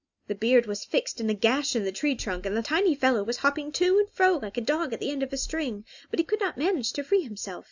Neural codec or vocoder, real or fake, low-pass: none; real; 7.2 kHz